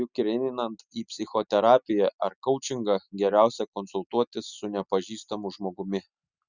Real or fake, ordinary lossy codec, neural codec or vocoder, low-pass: real; Opus, 64 kbps; none; 7.2 kHz